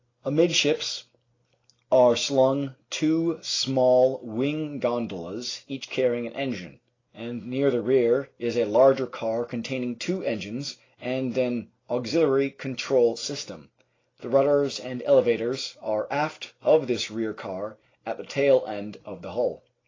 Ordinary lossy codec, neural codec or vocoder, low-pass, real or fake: AAC, 32 kbps; none; 7.2 kHz; real